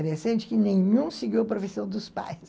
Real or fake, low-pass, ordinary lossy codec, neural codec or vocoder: real; none; none; none